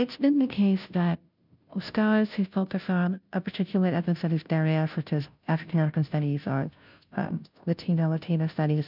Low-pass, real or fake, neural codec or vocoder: 5.4 kHz; fake; codec, 16 kHz, 0.5 kbps, FunCodec, trained on Chinese and English, 25 frames a second